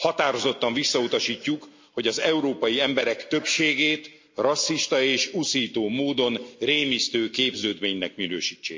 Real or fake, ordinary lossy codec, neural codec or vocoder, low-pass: real; MP3, 48 kbps; none; 7.2 kHz